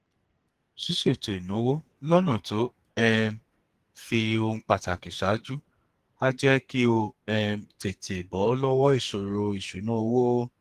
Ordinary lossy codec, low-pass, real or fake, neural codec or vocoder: Opus, 24 kbps; 14.4 kHz; fake; codec, 44.1 kHz, 2.6 kbps, SNAC